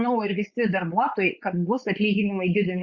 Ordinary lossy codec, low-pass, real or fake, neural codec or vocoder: Opus, 64 kbps; 7.2 kHz; fake; codec, 16 kHz, 4.8 kbps, FACodec